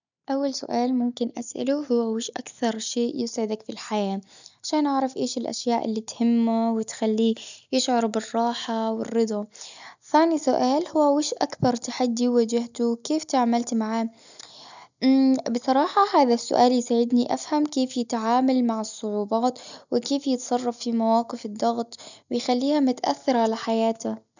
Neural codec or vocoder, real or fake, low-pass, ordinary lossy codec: none; real; 7.2 kHz; none